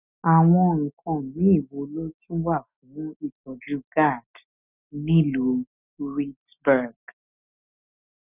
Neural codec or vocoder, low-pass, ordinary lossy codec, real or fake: none; 3.6 kHz; none; real